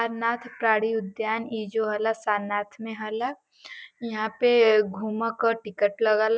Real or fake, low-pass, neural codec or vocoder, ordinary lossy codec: real; none; none; none